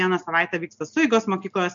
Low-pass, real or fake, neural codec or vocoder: 7.2 kHz; real; none